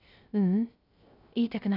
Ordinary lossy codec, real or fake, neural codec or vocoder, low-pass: none; fake; codec, 16 kHz, 0.3 kbps, FocalCodec; 5.4 kHz